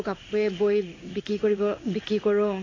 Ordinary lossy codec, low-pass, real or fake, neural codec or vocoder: AAC, 32 kbps; 7.2 kHz; fake; codec, 16 kHz in and 24 kHz out, 1 kbps, XY-Tokenizer